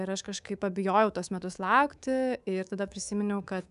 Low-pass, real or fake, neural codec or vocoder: 10.8 kHz; fake; codec, 24 kHz, 3.1 kbps, DualCodec